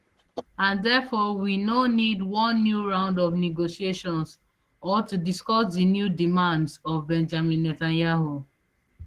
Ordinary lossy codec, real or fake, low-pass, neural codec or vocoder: Opus, 16 kbps; fake; 14.4 kHz; codec, 44.1 kHz, 7.8 kbps, Pupu-Codec